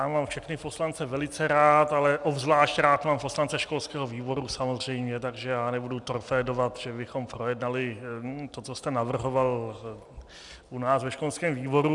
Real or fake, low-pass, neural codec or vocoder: real; 10.8 kHz; none